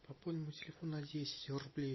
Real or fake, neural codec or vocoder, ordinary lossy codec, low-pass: real; none; MP3, 24 kbps; 7.2 kHz